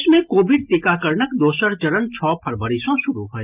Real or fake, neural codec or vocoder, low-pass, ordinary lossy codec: real; none; 3.6 kHz; Opus, 24 kbps